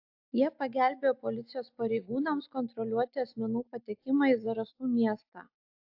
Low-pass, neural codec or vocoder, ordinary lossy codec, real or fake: 5.4 kHz; none; AAC, 48 kbps; real